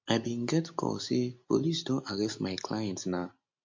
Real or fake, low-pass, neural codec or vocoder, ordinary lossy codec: real; 7.2 kHz; none; MP3, 48 kbps